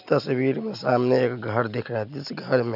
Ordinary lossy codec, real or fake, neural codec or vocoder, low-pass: none; real; none; 5.4 kHz